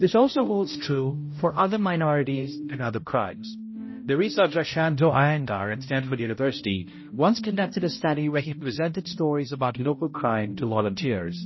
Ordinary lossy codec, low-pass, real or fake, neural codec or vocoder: MP3, 24 kbps; 7.2 kHz; fake; codec, 16 kHz, 0.5 kbps, X-Codec, HuBERT features, trained on balanced general audio